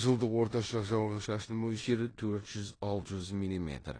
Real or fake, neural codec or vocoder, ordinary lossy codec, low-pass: fake; codec, 16 kHz in and 24 kHz out, 0.9 kbps, LongCat-Audio-Codec, four codebook decoder; AAC, 32 kbps; 9.9 kHz